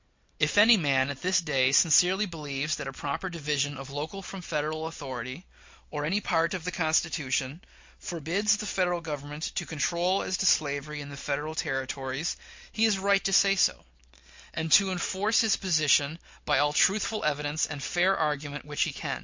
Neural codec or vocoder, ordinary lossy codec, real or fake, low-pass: none; MP3, 48 kbps; real; 7.2 kHz